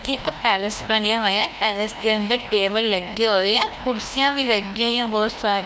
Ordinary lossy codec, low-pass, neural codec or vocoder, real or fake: none; none; codec, 16 kHz, 1 kbps, FreqCodec, larger model; fake